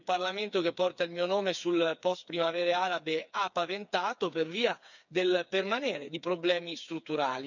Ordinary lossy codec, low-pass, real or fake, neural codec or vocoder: none; 7.2 kHz; fake; codec, 16 kHz, 4 kbps, FreqCodec, smaller model